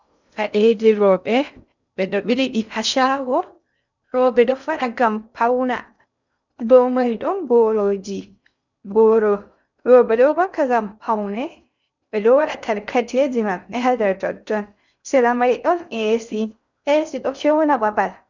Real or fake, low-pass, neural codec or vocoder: fake; 7.2 kHz; codec, 16 kHz in and 24 kHz out, 0.6 kbps, FocalCodec, streaming, 2048 codes